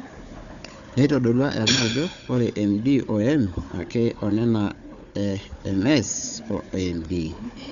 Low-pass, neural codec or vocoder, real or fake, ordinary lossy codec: 7.2 kHz; codec, 16 kHz, 4 kbps, FunCodec, trained on Chinese and English, 50 frames a second; fake; none